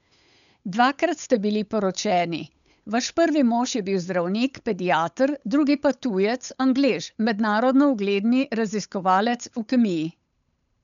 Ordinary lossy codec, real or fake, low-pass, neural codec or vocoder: none; fake; 7.2 kHz; codec, 16 kHz, 8 kbps, FunCodec, trained on Chinese and English, 25 frames a second